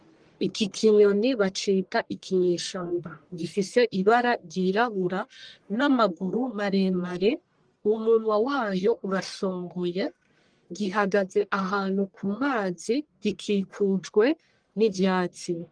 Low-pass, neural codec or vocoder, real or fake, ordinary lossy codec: 9.9 kHz; codec, 44.1 kHz, 1.7 kbps, Pupu-Codec; fake; Opus, 24 kbps